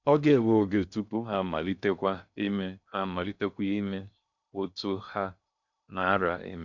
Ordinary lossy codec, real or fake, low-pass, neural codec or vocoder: none; fake; 7.2 kHz; codec, 16 kHz in and 24 kHz out, 0.6 kbps, FocalCodec, streaming, 2048 codes